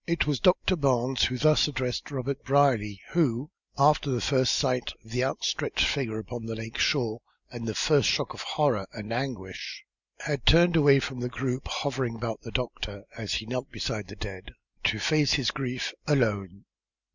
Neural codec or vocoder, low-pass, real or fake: none; 7.2 kHz; real